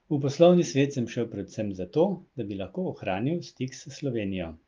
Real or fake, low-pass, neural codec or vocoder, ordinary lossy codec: real; 7.2 kHz; none; Opus, 24 kbps